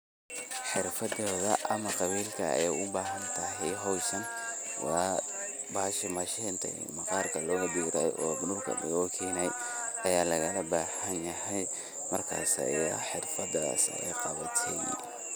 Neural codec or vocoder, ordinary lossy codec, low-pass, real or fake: none; none; none; real